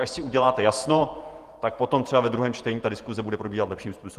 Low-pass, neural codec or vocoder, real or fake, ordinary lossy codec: 14.4 kHz; vocoder, 48 kHz, 128 mel bands, Vocos; fake; Opus, 24 kbps